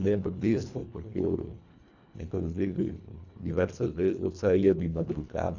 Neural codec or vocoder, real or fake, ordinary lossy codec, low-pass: codec, 24 kHz, 1.5 kbps, HILCodec; fake; none; 7.2 kHz